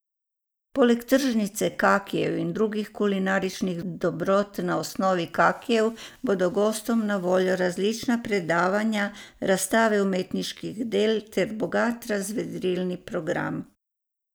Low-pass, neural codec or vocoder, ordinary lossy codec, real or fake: none; none; none; real